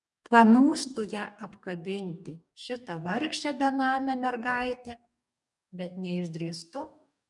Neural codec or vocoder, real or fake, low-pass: codec, 44.1 kHz, 2.6 kbps, DAC; fake; 10.8 kHz